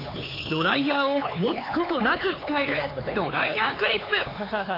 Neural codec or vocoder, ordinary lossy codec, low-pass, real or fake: codec, 16 kHz, 4 kbps, X-Codec, HuBERT features, trained on LibriSpeech; AAC, 24 kbps; 5.4 kHz; fake